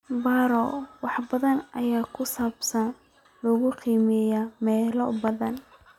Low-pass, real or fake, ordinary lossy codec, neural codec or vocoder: 19.8 kHz; real; none; none